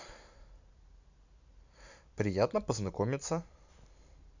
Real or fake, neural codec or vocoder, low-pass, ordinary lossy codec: real; none; 7.2 kHz; none